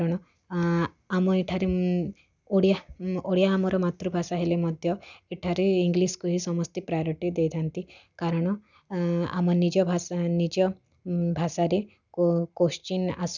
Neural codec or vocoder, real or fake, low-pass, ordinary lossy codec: none; real; 7.2 kHz; none